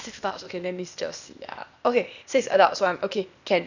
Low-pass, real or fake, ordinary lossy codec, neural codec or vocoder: 7.2 kHz; fake; none; codec, 16 kHz, 0.8 kbps, ZipCodec